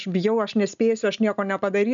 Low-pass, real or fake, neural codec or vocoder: 7.2 kHz; real; none